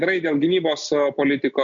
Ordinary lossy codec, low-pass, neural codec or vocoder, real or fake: MP3, 64 kbps; 7.2 kHz; none; real